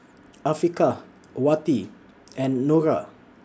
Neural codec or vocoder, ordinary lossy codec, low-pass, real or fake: none; none; none; real